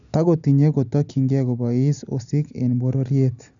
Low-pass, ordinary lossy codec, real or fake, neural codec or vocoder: 7.2 kHz; none; real; none